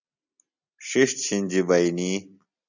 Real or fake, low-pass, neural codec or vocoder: real; 7.2 kHz; none